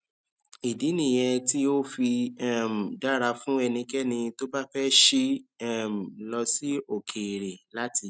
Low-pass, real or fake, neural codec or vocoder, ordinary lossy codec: none; real; none; none